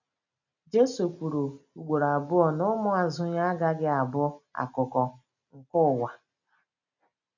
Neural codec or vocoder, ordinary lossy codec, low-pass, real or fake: none; none; 7.2 kHz; real